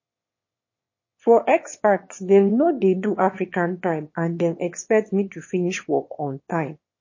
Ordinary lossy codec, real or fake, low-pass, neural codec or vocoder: MP3, 32 kbps; fake; 7.2 kHz; autoencoder, 22.05 kHz, a latent of 192 numbers a frame, VITS, trained on one speaker